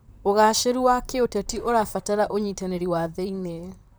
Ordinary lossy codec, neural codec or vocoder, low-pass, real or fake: none; vocoder, 44.1 kHz, 128 mel bands, Pupu-Vocoder; none; fake